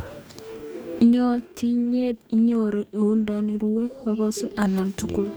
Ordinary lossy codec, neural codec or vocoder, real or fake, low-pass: none; codec, 44.1 kHz, 2.6 kbps, DAC; fake; none